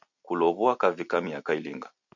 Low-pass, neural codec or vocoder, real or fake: 7.2 kHz; none; real